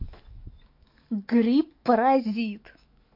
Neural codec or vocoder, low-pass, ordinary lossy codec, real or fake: autoencoder, 48 kHz, 128 numbers a frame, DAC-VAE, trained on Japanese speech; 5.4 kHz; MP3, 32 kbps; fake